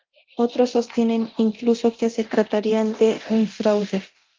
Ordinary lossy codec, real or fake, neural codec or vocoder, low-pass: Opus, 32 kbps; fake; codec, 24 kHz, 0.9 kbps, DualCodec; 7.2 kHz